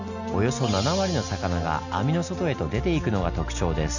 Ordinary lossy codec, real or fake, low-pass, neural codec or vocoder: none; real; 7.2 kHz; none